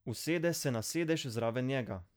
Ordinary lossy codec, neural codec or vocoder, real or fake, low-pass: none; none; real; none